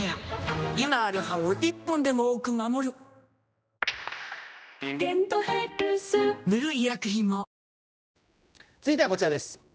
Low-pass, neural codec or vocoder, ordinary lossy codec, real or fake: none; codec, 16 kHz, 1 kbps, X-Codec, HuBERT features, trained on general audio; none; fake